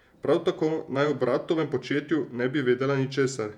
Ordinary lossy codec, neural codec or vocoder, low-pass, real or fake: none; vocoder, 48 kHz, 128 mel bands, Vocos; 19.8 kHz; fake